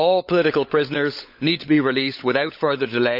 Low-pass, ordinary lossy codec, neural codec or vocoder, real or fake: 5.4 kHz; none; codec, 16 kHz, 16 kbps, FunCodec, trained on LibriTTS, 50 frames a second; fake